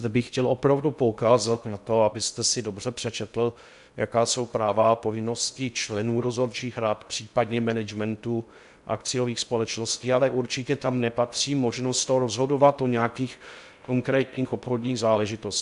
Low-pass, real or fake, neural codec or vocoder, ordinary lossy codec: 10.8 kHz; fake; codec, 16 kHz in and 24 kHz out, 0.6 kbps, FocalCodec, streaming, 2048 codes; MP3, 96 kbps